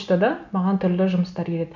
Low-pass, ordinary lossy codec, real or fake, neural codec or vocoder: 7.2 kHz; none; real; none